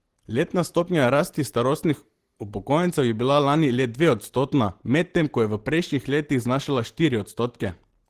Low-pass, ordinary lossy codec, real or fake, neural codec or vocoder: 14.4 kHz; Opus, 16 kbps; real; none